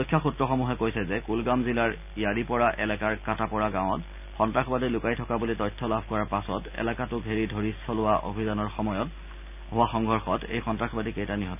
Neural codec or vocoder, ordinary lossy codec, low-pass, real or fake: none; none; 3.6 kHz; real